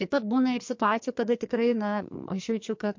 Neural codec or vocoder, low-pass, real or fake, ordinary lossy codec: codec, 44.1 kHz, 2.6 kbps, SNAC; 7.2 kHz; fake; MP3, 48 kbps